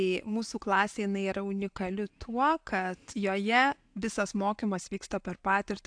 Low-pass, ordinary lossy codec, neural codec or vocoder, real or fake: 9.9 kHz; AAC, 64 kbps; none; real